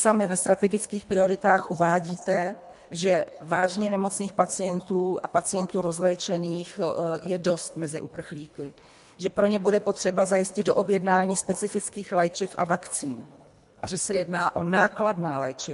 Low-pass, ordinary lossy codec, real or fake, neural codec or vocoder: 10.8 kHz; MP3, 64 kbps; fake; codec, 24 kHz, 1.5 kbps, HILCodec